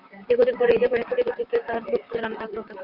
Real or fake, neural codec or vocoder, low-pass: fake; vocoder, 44.1 kHz, 128 mel bands, Pupu-Vocoder; 5.4 kHz